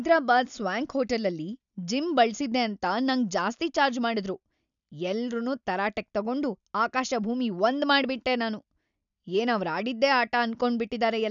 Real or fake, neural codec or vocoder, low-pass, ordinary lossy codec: real; none; 7.2 kHz; none